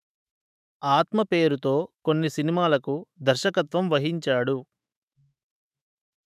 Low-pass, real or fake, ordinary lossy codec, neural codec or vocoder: 14.4 kHz; fake; none; autoencoder, 48 kHz, 128 numbers a frame, DAC-VAE, trained on Japanese speech